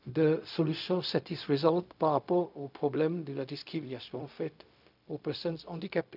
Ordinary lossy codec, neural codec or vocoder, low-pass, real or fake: none; codec, 16 kHz, 0.4 kbps, LongCat-Audio-Codec; 5.4 kHz; fake